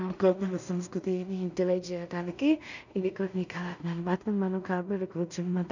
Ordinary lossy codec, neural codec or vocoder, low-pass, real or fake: none; codec, 16 kHz in and 24 kHz out, 0.4 kbps, LongCat-Audio-Codec, two codebook decoder; 7.2 kHz; fake